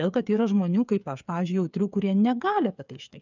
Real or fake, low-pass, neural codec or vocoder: fake; 7.2 kHz; codec, 16 kHz, 8 kbps, FreqCodec, smaller model